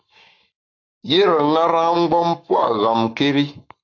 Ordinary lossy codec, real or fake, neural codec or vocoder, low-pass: AAC, 48 kbps; fake; codec, 44.1 kHz, 7.8 kbps, Pupu-Codec; 7.2 kHz